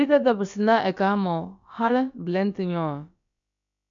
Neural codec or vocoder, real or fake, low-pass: codec, 16 kHz, about 1 kbps, DyCAST, with the encoder's durations; fake; 7.2 kHz